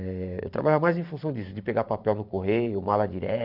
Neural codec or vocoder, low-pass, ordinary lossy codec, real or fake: vocoder, 44.1 kHz, 128 mel bands every 512 samples, BigVGAN v2; 5.4 kHz; none; fake